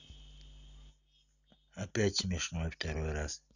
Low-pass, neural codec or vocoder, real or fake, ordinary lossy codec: 7.2 kHz; none; real; none